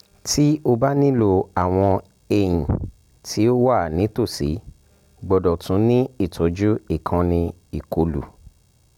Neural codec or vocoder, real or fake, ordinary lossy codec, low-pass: none; real; none; 19.8 kHz